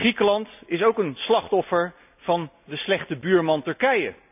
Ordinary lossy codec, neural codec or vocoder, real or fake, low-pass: none; none; real; 3.6 kHz